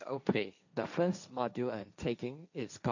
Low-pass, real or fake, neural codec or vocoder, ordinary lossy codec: 7.2 kHz; fake; codec, 16 kHz, 1.1 kbps, Voila-Tokenizer; none